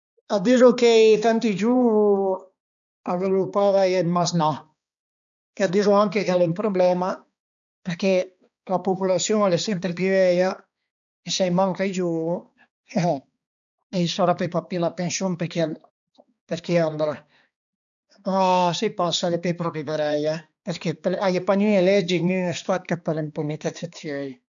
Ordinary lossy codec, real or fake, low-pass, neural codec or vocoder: none; fake; 7.2 kHz; codec, 16 kHz, 2 kbps, X-Codec, HuBERT features, trained on balanced general audio